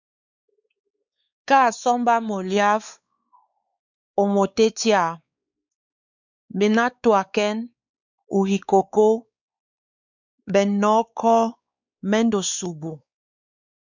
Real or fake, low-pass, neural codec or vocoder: fake; 7.2 kHz; codec, 16 kHz, 4 kbps, X-Codec, WavLM features, trained on Multilingual LibriSpeech